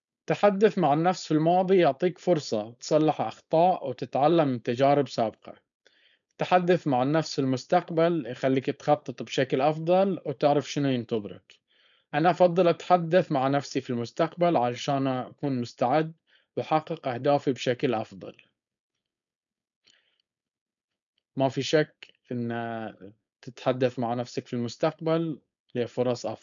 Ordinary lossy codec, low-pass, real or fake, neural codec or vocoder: none; 7.2 kHz; fake; codec, 16 kHz, 4.8 kbps, FACodec